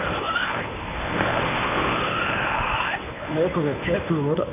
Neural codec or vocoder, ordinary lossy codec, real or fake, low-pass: codec, 24 kHz, 0.9 kbps, WavTokenizer, medium speech release version 1; none; fake; 3.6 kHz